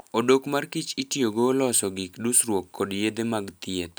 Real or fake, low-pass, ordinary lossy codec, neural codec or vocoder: real; none; none; none